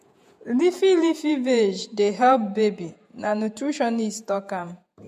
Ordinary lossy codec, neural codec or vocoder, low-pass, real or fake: MP3, 64 kbps; vocoder, 48 kHz, 128 mel bands, Vocos; 14.4 kHz; fake